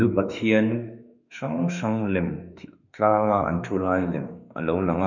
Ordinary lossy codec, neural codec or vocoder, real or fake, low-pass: none; autoencoder, 48 kHz, 32 numbers a frame, DAC-VAE, trained on Japanese speech; fake; 7.2 kHz